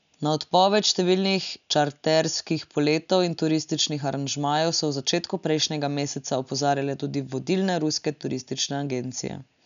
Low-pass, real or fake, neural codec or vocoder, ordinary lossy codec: 7.2 kHz; real; none; none